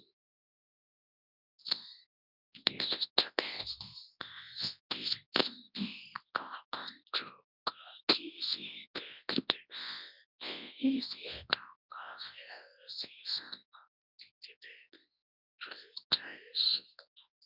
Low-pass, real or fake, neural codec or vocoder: 5.4 kHz; fake; codec, 24 kHz, 0.9 kbps, WavTokenizer, large speech release